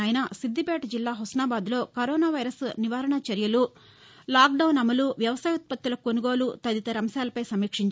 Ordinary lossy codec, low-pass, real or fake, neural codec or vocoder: none; none; real; none